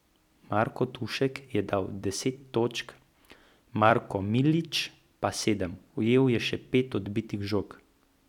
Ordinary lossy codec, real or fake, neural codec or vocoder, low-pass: none; fake; vocoder, 44.1 kHz, 128 mel bands every 256 samples, BigVGAN v2; 19.8 kHz